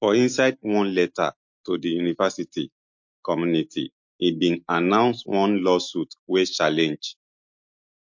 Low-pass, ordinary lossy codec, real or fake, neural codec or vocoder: 7.2 kHz; MP3, 48 kbps; real; none